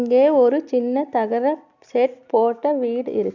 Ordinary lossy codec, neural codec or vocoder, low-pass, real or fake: none; none; 7.2 kHz; real